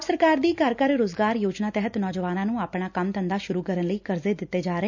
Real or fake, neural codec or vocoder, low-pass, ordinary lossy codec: real; none; 7.2 kHz; none